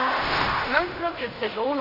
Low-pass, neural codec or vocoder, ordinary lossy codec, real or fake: 5.4 kHz; codec, 16 kHz in and 24 kHz out, 0.4 kbps, LongCat-Audio-Codec, fine tuned four codebook decoder; none; fake